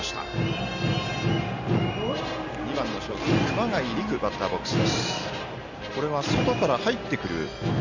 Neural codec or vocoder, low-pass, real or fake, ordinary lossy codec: none; 7.2 kHz; real; none